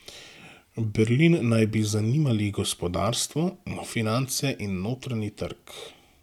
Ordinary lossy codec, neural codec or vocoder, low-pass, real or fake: none; none; 19.8 kHz; real